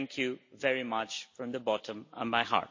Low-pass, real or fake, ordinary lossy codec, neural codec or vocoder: 7.2 kHz; real; MP3, 32 kbps; none